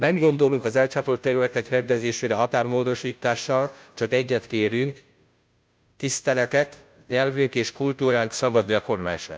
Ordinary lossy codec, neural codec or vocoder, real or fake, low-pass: none; codec, 16 kHz, 0.5 kbps, FunCodec, trained on Chinese and English, 25 frames a second; fake; none